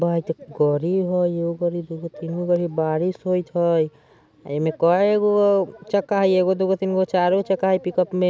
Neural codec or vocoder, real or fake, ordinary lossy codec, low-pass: none; real; none; none